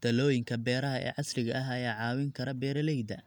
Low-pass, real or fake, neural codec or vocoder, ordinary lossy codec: 19.8 kHz; real; none; none